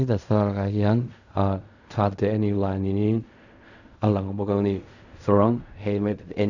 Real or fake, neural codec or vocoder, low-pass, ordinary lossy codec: fake; codec, 16 kHz in and 24 kHz out, 0.4 kbps, LongCat-Audio-Codec, fine tuned four codebook decoder; 7.2 kHz; none